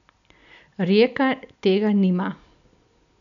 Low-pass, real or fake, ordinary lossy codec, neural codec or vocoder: 7.2 kHz; real; none; none